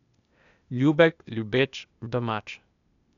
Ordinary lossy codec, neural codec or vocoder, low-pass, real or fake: none; codec, 16 kHz, 0.8 kbps, ZipCodec; 7.2 kHz; fake